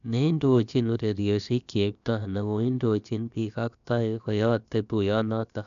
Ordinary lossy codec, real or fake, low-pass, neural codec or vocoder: none; fake; 7.2 kHz; codec, 16 kHz, about 1 kbps, DyCAST, with the encoder's durations